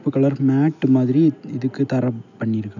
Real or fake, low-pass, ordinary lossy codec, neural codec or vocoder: real; 7.2 kHz; none; none